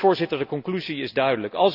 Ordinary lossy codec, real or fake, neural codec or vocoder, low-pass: none; real; none; 5.4 kHz